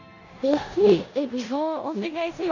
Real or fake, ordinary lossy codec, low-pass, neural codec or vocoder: fake; none; 7.2 kHz; codec, 16 kHz in and 24 kHz out, 0.9 kbps, LongCat-Audio-Codec, four codebook decoder